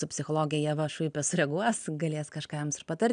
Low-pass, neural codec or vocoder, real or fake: 9.9 kHz; none; real